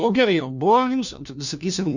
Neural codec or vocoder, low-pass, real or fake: codec, 16 kHz, 1 kbps, FunCodec, trained on LibriTTS, 50 frames a second; 7.2 kHz; fake